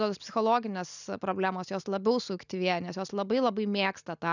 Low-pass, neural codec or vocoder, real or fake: 7.2 kHz; none; real